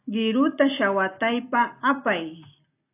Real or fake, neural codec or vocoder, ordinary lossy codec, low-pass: real; none; AAC, 24 kbps; 3.6 kHz